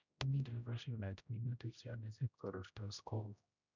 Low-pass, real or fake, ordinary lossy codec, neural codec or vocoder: 7.2 kHz; fake; none; codec, 16 kHz, 0.5 kbps, X-Codec, HuBERT features, trained on general audio